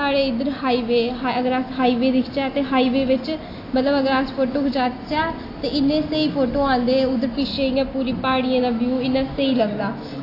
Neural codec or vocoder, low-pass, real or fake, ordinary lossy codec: none; 5.4 kHz; real; AAC, 32 kbps